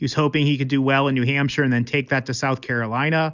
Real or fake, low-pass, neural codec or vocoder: real; 7.2 kHz; none